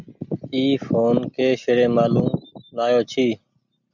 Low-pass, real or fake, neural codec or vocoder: 7.2 kHz; real; none